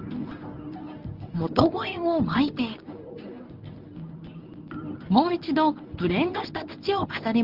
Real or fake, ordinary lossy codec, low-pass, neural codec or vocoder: fake; Opus, 24 kbps; 5.4 kHz; codec, 24 kHz, 0.9 kbps, WavTokenizer, medium speech release version 1